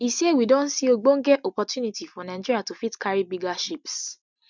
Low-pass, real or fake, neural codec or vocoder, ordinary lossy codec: 7.2 kHz; real; none; none